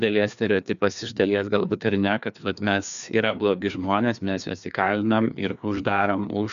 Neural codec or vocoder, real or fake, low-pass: codec, 16 kHz, 2 kbps, FreqCodec, larger model; fake; 7.2 kHz